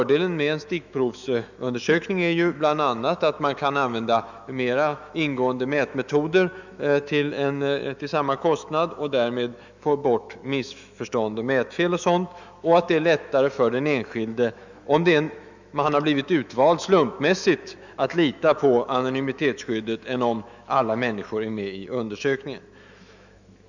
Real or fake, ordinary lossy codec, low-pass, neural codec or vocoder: fake; none; 7.2 kHz; autoencoder, 48 kHz, 128 numbers a frame, DAC-VAE, trained on Japanese speech